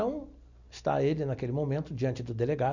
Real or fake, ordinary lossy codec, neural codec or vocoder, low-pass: real; none; none; 7.2 kHz